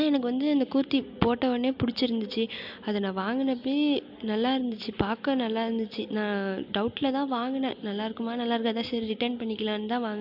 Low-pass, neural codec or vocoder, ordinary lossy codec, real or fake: 5.4 kHz; none; MP3, 48 kbps; real